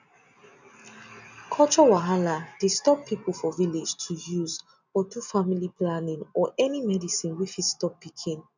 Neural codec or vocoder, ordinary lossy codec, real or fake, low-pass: none; none; real; 7.2 kHz